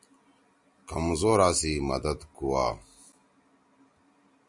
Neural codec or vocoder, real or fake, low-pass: none; real; 10.8 kHz